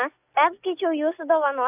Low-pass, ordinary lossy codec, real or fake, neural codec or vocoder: 3.6 kHz; AAC, 32 kbps; real; none